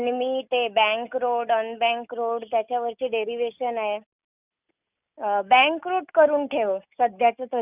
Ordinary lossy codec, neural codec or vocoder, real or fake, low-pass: none; none; real; 3.6 kHz